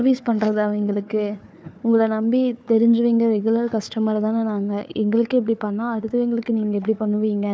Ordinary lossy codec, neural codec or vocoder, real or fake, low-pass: none; codec, 16 kHz, 4 kbps, FunCodec, trained on Chinese and English, 50 frames a second; fake; none